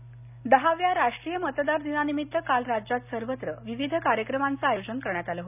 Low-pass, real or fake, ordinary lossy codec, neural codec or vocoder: 3.6 kHz; real; none; none